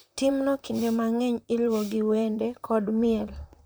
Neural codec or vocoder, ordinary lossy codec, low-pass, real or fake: vocoder, 44.1 kHz, 128 mel bands, Pupu-Vocoder; none; none; fake